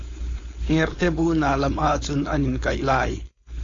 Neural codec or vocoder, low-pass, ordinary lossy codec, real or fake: codec, 16 kHz, 4.8 kbps, FACodec; 7.2 kHz; AAC, 32 kbps; fake